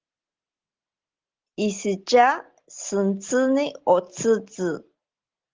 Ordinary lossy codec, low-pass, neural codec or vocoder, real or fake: Opus, 16 kbps; 7.2 kHz; none; real